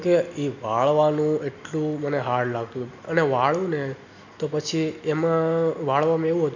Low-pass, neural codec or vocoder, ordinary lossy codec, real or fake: 7.2 kHz; none; none; real